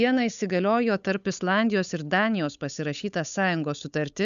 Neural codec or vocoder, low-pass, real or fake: codec, 16 kHz, 8 kbps, FunCodec, trained on Chinese and English, 25 frames a second; 7.2 kHz; fake